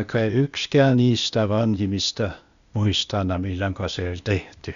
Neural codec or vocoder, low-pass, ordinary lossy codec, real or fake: codec, 16 kHz, 0.8 kbps, ZipCodec; 7.2 kHz; Opus, 64 kbps; fake